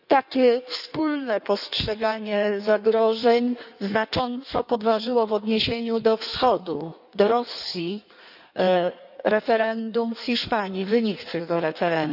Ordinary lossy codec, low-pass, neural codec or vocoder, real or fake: none; 5.4 kHz; codec, 16 kHz in and 24 kHz out, 1.1 kbps, FireRedTTS-2 codec; fake